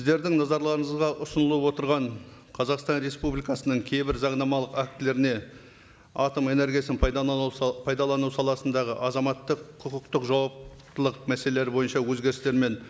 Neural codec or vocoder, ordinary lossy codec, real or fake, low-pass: none; none; real; none